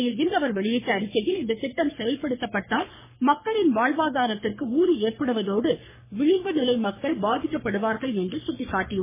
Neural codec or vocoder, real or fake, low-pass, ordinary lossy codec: codec, 44.1 kHz, 3.4 kbps, Pupu-Codec; fake; 3.6 kHz; MP3, 16 kbps